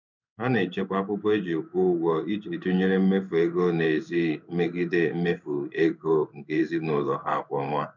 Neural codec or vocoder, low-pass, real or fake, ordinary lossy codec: codec, 16 kHz in and 24 kHz out, 1 kbps, XY-Tokenizer; 7.2 kHz; fake; none